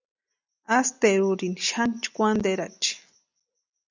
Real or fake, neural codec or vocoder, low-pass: real; none; 7.2 kHz